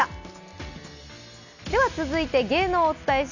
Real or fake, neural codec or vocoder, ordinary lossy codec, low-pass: real; none; none; 7.2 kHz